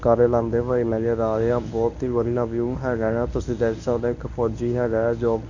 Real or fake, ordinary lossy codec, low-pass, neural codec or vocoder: fake; none; 7.2 kHz; codec, 24 kHz, 0.9 kbps, WavTokenizer, medium speech release version 1